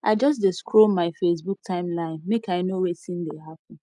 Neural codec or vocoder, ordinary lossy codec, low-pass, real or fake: none; none; 10.8 kHz; real